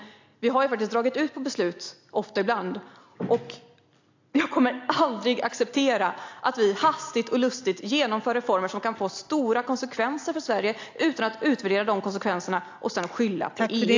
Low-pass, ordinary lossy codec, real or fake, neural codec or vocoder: 7.2 kHz; AAC, 48 kbps; real; none